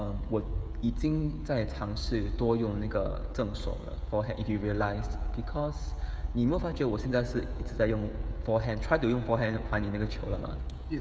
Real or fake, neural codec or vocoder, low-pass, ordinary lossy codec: fake; codec, 16 kHz, 16 kbps, FreqCodec, smaller model; none; none